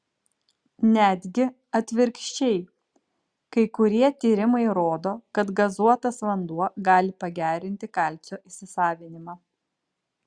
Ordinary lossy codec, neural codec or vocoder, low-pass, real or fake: Opus, 64 kbps; none; 9.9 kHz; real